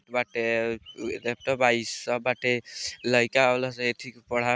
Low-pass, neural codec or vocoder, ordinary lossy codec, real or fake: none; none; none; real